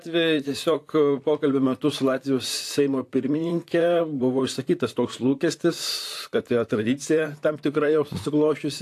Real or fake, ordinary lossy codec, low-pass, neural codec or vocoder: fake; AAC, 64 kbps; 14.4 kHz; vocoder, 44.1 kHz, 128 mel bands, Pupu-Vocoder